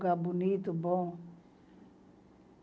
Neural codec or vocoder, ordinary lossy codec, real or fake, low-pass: none; none; real; none